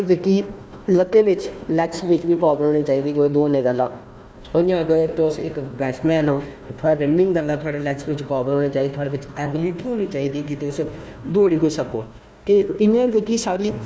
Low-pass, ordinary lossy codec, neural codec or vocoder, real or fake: none; none; codec, 16 kHz, 1 kbps, FunCodec, trained on Chinese and English, 50 frames a second; fake